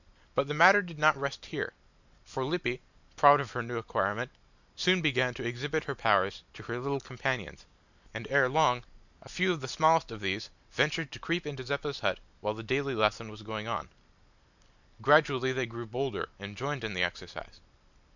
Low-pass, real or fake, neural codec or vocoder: 7.2 kHz; real; none